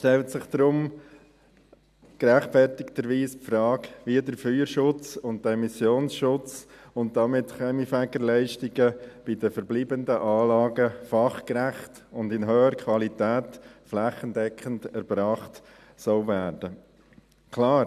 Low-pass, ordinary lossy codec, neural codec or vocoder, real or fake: 14.4 kHz; none; none; real